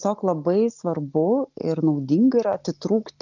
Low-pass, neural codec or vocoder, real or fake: 7.2 kHz; none; real